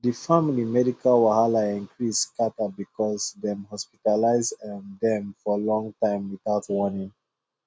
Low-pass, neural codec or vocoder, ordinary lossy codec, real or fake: none; none; none; real